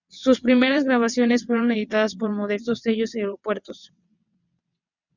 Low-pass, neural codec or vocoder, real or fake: 7.2 kHz; vocoder, 22.05 kHz, 80 mel bands, WaveNeXt; fake